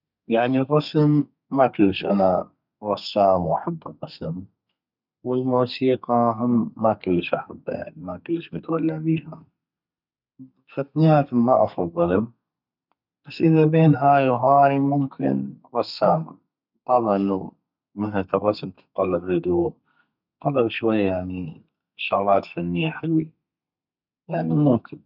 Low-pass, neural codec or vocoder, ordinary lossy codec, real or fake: 5.4 kHz; codec, 32 kHz, 1.9 kbps, SNAC; none; fake